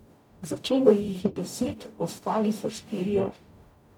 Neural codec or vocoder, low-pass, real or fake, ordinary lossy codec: codec, 44.1 kHz, 0.9 kbps, DAC; 19.8 kHz; fake; none